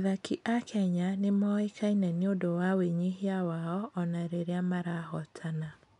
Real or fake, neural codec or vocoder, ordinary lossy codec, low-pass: real; none; none; 10.8 kHz